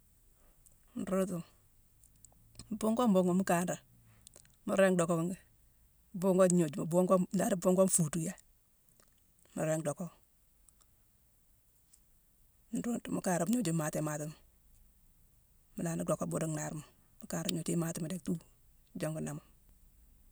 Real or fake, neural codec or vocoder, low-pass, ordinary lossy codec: real; none; none; none